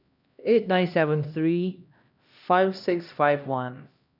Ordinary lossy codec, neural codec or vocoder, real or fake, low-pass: none; codec, 16 kHz, 1 kbps, X-Codec, HuBERT features, trained on LibriSpeech; fake; 5.4 kHz